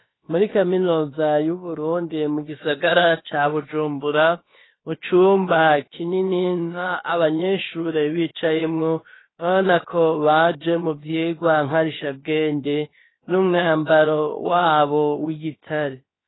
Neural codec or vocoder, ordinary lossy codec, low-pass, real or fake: codec, 16 kHz, about 1 kbps, DyCAST, with the encoder's durations; AAC, 16 kbps; 7.2 kHz; fake